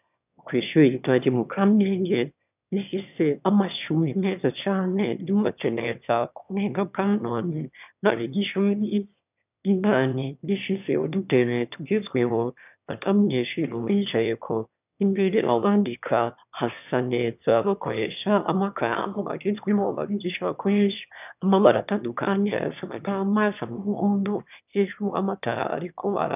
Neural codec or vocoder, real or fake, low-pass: autoencoder, 22.05 kHz, a latent of 192 numbers a frame, VITS, trained on one speaker; fake; 3.6 kHz